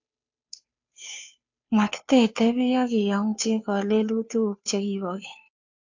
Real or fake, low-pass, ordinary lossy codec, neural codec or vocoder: fake; 7.2 kHz; AAC, 48 kbps; codec, 16 kHz, 2 kbps, FunCodec, trained on Chinese and English, 25 frames a second